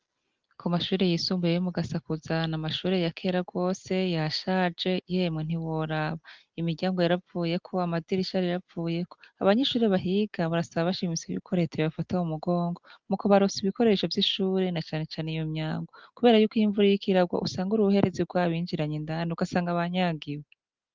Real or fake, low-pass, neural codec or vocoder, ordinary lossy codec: real; 7.2 kHz; none; Opus, 32 kbps